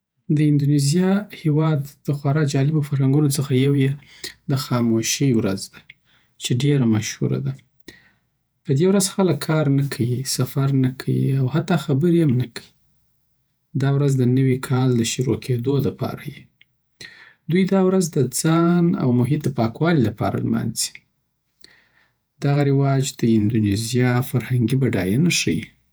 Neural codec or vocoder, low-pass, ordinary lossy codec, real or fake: vocoder, 48 kHz, 128 mel bands, Vocos; none; none; fake